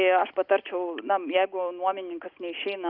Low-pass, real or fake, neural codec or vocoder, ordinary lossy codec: 5.4 kHz; real; none; Opus, 24 kbps